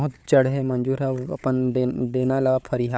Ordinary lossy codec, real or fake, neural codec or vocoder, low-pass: none; fake; codec, 16 kHz, 4 kbps, FunCodec, trained on Chinese and English, 50 frames a second; none